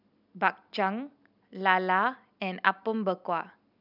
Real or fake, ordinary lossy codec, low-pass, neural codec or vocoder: real; none; 5.4 kHz; none